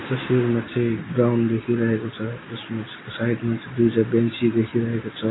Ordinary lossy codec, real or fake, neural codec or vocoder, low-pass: AAC, 16 kbps; real; none; 7.2 kHz